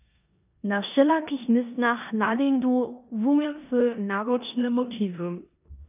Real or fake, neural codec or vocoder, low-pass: fake; codec, 16 kHz in and 24 kHz out, 0.9 kbps, LongCat-Audio-Codec, four codebook decoder; 3.6 kHz